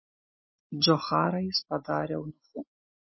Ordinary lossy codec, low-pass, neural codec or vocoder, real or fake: MP3, 24 kbps; 7.2 kHz; none; real